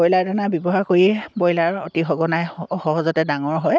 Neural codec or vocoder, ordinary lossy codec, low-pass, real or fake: none; none; none; real